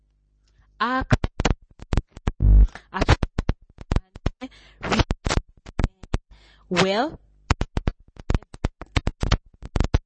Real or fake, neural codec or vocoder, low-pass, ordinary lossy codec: real; none; 9.9 kHz; MP3, 32 kbps